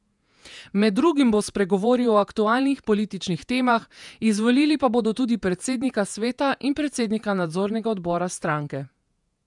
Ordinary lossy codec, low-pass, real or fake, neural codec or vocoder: none; 10.8 kHz; fake; vocoder, 48 kHz, 128 mel bands, Vocos